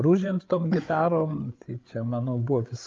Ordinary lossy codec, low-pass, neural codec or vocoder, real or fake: Opus, 32 kbps; 7.2 kHz; codec, 16 kHz, 8 kbps, FreqCodec, larger model; fake